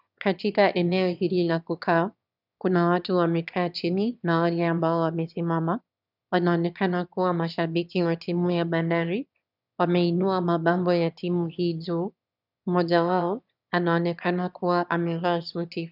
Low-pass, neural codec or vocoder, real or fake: 5.4 kHz; autoencoder, 22.05 kHz, a latent of 192 numbers a frame, VITS, trained on one speaker; fake